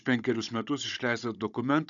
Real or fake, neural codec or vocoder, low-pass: fake; codec, 16 kHz, 16 kbps, FunCodec, trained on Chinese and English, 50 frames a second; 7.2 kHz